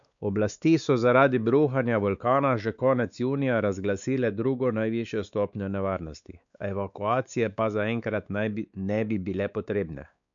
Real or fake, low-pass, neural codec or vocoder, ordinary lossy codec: fake; 7.2 kHz; codec, 16 kHz, 4 kbps, X-Codec, WavLM features, trained on Multilingual LibriSpeech; none